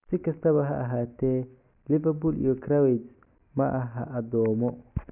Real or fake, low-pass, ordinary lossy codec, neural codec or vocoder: real; 3.6 kHz; none; none